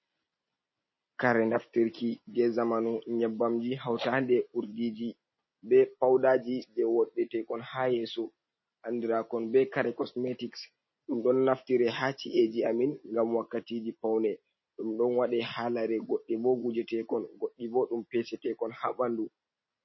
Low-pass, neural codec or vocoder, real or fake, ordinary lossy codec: 7.2 kHz; none; real; MP3, 24 kbps